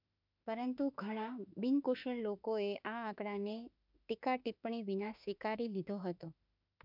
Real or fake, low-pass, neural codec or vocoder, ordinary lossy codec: fake; 5.4 kHz; autoencoder, 48 kHz, 32 numbers a frame, DAC-VAE, trained on Japanese speech; MP3, 48 kbps